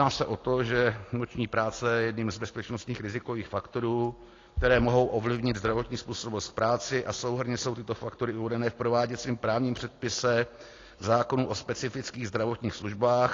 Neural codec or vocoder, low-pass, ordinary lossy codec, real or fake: none; 7.2 kHz; AAC, 32 kbps; real